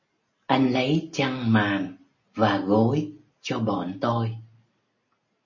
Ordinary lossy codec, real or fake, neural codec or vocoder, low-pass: MP3, 32 kbps; real; none; 7.2 kHz